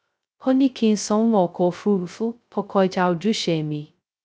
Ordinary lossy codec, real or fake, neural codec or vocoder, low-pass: none; fake; codec, 16 kHz, 0.2 kbps, FocalCodec; none